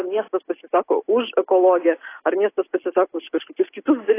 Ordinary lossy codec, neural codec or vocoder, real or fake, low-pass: AAC, 24 kbps; none; real; 3.6 kHz